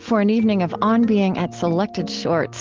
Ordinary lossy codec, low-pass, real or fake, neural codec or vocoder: Opus, 16 kbps; 7.2 kHz; real; none